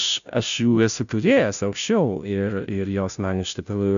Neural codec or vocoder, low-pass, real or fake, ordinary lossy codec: codec, 16 kHz, 0.5 kbps, FunCodec, trained on Chinese and English, 25 frames a second; 7.2 kHz; fake; AAC, 96 kbps